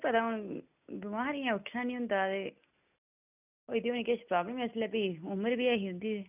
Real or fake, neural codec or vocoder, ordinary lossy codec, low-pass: real; none; none; 3.6 kHz